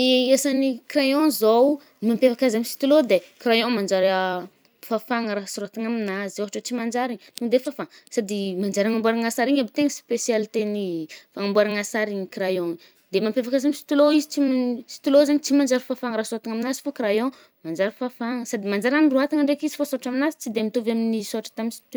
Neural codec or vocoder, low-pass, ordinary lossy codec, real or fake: vocoder, 44.1 kHz, 128 mel bands every 256 samples, BigVGAN v2; none; none; fake